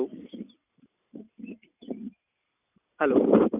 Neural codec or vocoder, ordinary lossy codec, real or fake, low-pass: none; none; real; 3.6 kHz